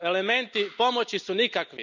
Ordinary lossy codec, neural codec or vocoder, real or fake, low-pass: none; none; real; 7.2 kHz